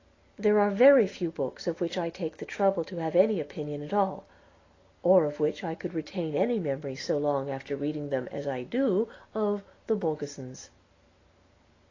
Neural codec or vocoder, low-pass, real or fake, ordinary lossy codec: none; 7.2 kHz; real; AAC, 32 kbps